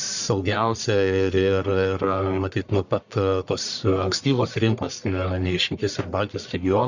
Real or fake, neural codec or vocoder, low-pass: fake; codec, 44.1 kHz, 1.7 kbps, Pupu-Codec; 7.2 kHz